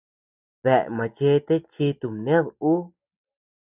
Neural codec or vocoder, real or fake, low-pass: none; real; 3.6 kHz